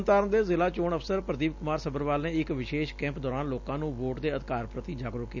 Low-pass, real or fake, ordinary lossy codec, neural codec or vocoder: 7.2 kHz; real; none; none